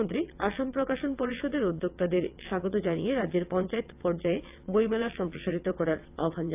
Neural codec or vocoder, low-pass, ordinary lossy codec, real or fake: vocoder, 22.05 kHz, 80 mel bands, WaveNeXt; 3.6 kHz; AAC, 32 kbps; fake